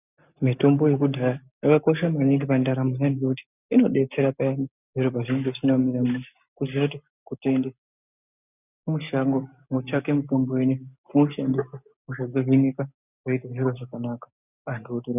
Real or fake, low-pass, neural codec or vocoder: real; 3.6 kHz; none